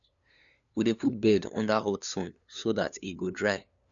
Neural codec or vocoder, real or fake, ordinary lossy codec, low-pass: codec, 16 kHz, 2 kbps, FunCodec, trained on LibriTTS, 25 frames a second; fake; none; 7.2 kHz